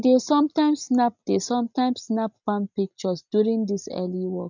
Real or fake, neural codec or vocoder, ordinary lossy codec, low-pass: real; none; none; 7.2 kHz